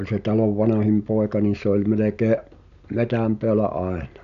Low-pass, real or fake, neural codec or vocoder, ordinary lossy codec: 7.2 kHz; real; none; none